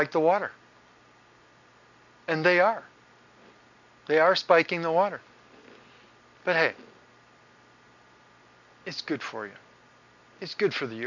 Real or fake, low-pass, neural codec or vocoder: real; 7.2 kHz; none